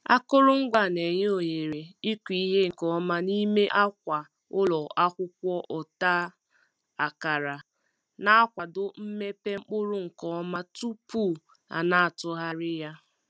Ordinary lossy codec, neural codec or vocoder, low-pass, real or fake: none; none; none; real